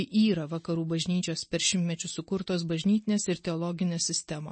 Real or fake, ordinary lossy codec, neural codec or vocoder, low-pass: real; MP3, 32 kbps; none; 9.9 kHz